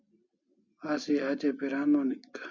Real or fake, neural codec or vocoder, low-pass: real; none; 7.2 kHz